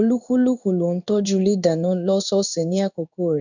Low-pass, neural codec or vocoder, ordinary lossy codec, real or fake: 7.2 kHz; codec, 16 kHz in and 24 kHz out, 1 kbps, XY-Tokenizer; none; fake